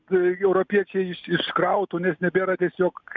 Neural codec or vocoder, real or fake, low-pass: none; real; 7.2 kHz